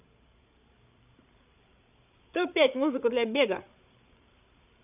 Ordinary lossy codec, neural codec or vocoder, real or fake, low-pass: none; codec, 16 kHz, 8 kbps, FreqCodec, larger model; fake; 3.6 kHz